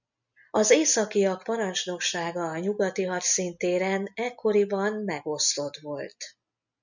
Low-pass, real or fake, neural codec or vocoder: 7.2 kHz; real; none